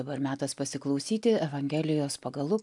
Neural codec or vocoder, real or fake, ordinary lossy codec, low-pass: none; real; MP3, 96 kbps; 10.8 kHz